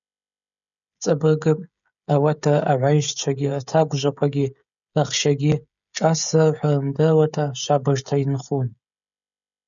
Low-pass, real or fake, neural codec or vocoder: 7.2 kHz; fake; codec, 16 kHz, 16 kbps, FreqCodec, smaller model